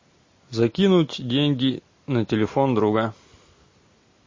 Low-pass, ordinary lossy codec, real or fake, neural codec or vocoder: 7.2 kHz; MP3, 32 kbps; real; none